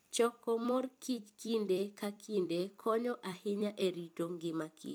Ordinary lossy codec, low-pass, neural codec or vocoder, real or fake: none; none; vocoder, 44.1 kHz, 128 mel bands every 256 samples, BigVGAN v2; fake